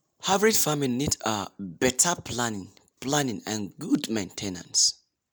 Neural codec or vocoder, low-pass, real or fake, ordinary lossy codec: none; none; real; none